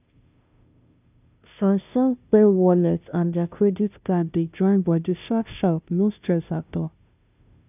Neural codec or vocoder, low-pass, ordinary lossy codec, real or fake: codec, 16 kHz, 0.5 kbps, FunCodec, trained on Chinese and English, 25 frames a second; 3.6 kHz; none; fake